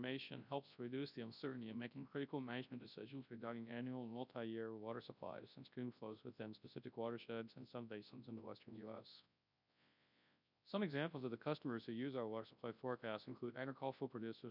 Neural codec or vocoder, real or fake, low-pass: codec, 24 kHz, 0.9 kbps, WavTokenizer, large speech release; fake; 5.4 kHz